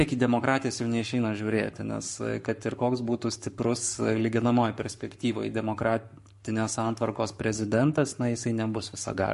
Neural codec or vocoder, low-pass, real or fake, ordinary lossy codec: codec, 44.1 kHz, 7.8 kbps, Pupu-Codec; 14.4 kHz; fake; MP3, 48 kbps